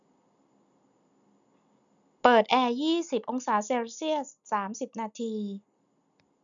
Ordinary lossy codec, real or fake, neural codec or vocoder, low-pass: none; real; none; 7.2 kHz